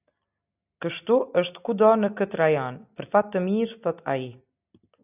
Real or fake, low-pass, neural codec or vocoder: real; 3.6 kHz; none